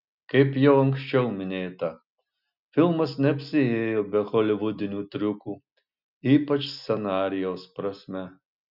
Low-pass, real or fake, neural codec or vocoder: 5.4 kHz; real; none